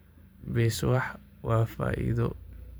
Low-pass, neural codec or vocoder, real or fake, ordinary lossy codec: none; vocoder, 44.1 kHz, 128 mel bands every 512 samples, BigVGAN v2; fake; none